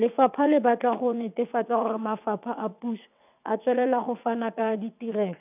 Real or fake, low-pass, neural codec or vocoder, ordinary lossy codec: fake; 3.6 kHz; vocoder, 22.05 kHz, 80 mel bands, WaveNeXt; none